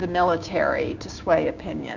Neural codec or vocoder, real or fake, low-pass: codec, 16 kHz, 6 kbps, DAC; fake; 7.2 kHz